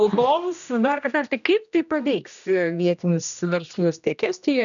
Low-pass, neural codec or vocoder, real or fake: 7.2 kHz; codec, 16 kHz, 1 kbps, X-Codec, HuBERT features, trained on general audio; fake